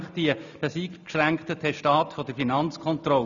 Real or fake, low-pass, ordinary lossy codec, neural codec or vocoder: real; 7.2 kHz; none; none